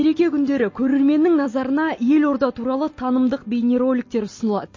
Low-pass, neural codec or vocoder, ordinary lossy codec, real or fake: 7.2 kHz; none; MP3, 32 kbps; real